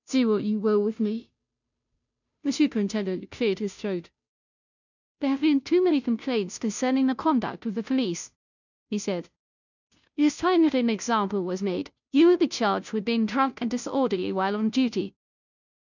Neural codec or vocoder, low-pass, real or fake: codec, 16 kHz, 0.5 kbps, FunCodec, trained on Chinese and English, 25 frames a second; 7.2 kHz; fake